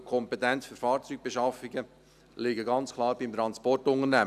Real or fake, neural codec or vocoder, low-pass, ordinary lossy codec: real; none; 14.4 kHz; none